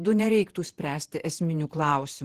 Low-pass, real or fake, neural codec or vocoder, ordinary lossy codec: 14.4 kHz; fake; vocoder, 48 kHz, 128 mel bands, Vocos; Opus, 16 kbps